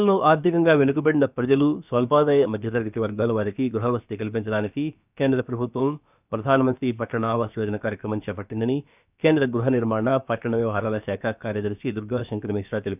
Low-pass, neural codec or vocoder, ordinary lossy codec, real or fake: 3.6 kHz; codec, 16 kHz, about 1 kbps, DyCAST, with the encoder's durations; none; fake